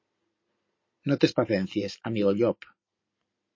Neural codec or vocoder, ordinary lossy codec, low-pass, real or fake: none; MP3, 32 kbps; 7.2 kHz; real